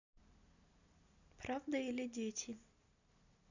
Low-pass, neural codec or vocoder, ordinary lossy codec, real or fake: 7.2 kHz; none; none; real